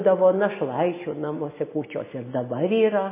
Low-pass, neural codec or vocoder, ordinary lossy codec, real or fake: 3.6 kHz; none; MP3, 16 kbps; real